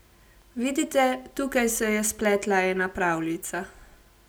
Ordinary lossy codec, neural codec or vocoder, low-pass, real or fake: none; none; none; real